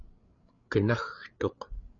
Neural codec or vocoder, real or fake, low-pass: codec, 16 kHz, 8 kbps, FreqCodec, larger model; fake; 7.2 kHz